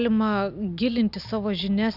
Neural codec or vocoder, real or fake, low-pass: none; real; 5.4 kHz